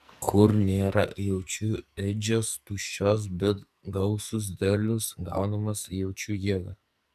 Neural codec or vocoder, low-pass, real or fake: codec, 44.1 kHz, 2.6 kbps, SNAC; 14.4 kHz; fake